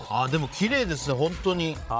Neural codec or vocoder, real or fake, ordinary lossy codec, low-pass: codec, 16 kHz, 16 kbps, FreqCodec, larger model; fake; none; none